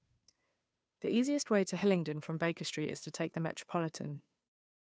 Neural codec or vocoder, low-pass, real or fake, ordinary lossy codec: codec, 16 kHz, 2 kbps, FunCodec, trained on Chinese and English, 25 frames a second; none; fake; none